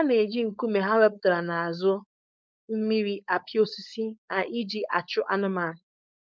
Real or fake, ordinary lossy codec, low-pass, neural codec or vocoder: fake; none; none; codec, 16 kHz, 4.8 kbps, FACodec